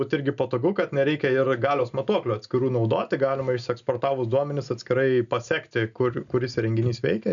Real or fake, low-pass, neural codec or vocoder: real; 7.2 kHz; none